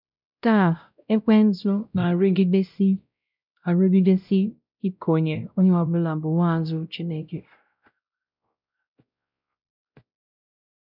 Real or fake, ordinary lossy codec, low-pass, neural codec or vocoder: fake; none; 5.4 kHz; codec, 16 kHz, 0.5 kbps, X-Codec, WavLM features, trained on Multilingual LibriSpeech